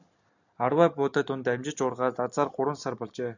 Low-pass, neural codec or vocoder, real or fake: 7.2 kHz; none; real